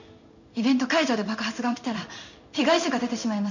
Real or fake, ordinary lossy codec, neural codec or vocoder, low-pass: fake; none; codec, 16 kHz in and 24 kHz out, 1 kbps, XY-Tokenizer; 7.2 kHz